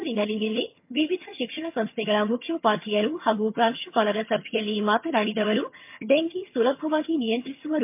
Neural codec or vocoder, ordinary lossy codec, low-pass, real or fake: vocoder, 22.05 kHz, 80 mel bands, HiFi-GAN; MP3, 32 kbps; 3.6 kHz; fake